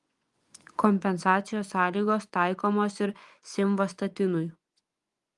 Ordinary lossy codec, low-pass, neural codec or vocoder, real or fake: Opus, 32 kbps; 10.8 kHz; vocoder, 24 kHz, 100 mel bands, Vocos; fake